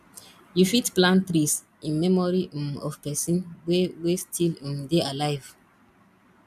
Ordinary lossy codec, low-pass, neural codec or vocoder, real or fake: none; 14.4 kHz; none; real